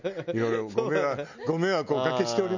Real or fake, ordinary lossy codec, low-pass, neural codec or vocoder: real; none; 7.2 kHz; none